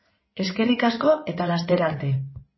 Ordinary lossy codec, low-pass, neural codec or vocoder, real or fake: MP3, 24 kbps; 7.2 kHz; codec, 16 kHz in and 24 kHz out, 2.2 kbps, FireRedTTS-2 codec; fake